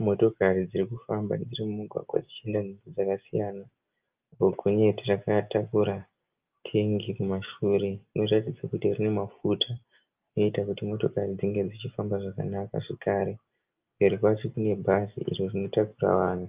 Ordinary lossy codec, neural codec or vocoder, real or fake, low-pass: Opus, 24 kbps; none; real; 3.6 kHz